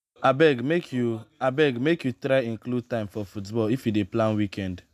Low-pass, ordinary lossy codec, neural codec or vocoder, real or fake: 14.4 kHz; none; none; real